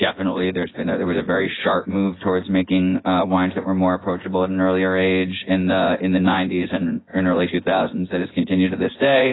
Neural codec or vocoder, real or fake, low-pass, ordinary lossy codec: vocoder, 24 kHz, 100 mel bands, Vocos; fake; 7.2 kHz; AAC, 16 kbps